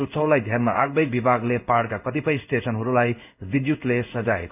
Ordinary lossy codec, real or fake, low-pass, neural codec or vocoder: none; fake; 3.6 kHz; codec, 16 kHz in and 24 kHz out, 1 kbps, XY-Tokenizer